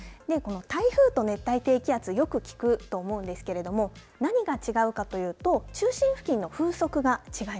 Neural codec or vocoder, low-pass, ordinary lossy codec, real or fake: none; none; none; real